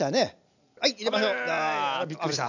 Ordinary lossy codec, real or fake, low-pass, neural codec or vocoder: none; real; 7.2 kHz; none